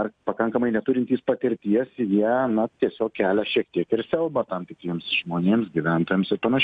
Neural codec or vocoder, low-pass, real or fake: none; 9.9 kHz; real